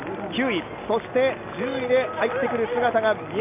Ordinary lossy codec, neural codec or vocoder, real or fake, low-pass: AAC, 32 kbps; none; real; 3.6 kHz